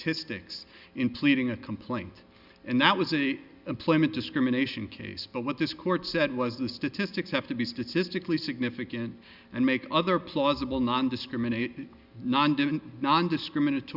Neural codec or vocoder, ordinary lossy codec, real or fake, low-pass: none; Opus, 64 kbps; real; 5.4 kHz